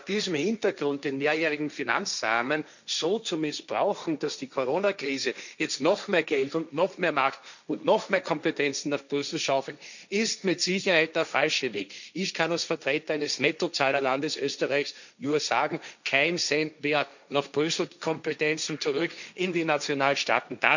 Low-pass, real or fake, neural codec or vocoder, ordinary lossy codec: 7.2 kHz; fake; codec, 16 kHz, 1.1 kbps, Voila-Tokenizer; none